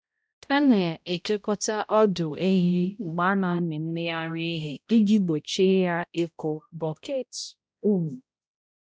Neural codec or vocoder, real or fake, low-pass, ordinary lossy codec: codec, 16 kHz, 0.5 kbps, X-Codec, HuBERT features, trained on balanced general audio; fake; none; none